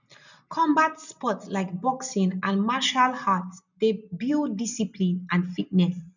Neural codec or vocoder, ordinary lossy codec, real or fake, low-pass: none; none; real; 7.2 kHz